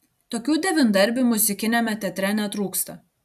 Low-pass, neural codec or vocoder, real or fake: 14.4 kHz; none; real